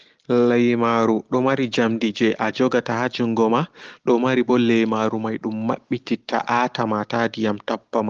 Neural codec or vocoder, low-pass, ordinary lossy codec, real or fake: none; 7.2 kHz; Opus, 16 kbps; real